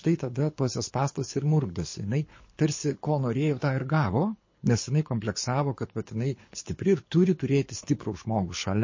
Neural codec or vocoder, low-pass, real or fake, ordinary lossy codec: codec, 24 kHz, 6 kbps, HILCodec; 7.2 kHz; fake; MP3, 32 kbps